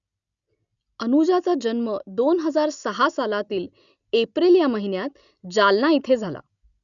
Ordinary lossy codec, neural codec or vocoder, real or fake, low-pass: none; none; real; 7.2 kHz